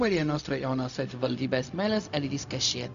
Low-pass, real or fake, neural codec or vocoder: 7.2 kHz; fake; codec, 16 kHz, 0.4 kbps, LongCat-Audio-Codec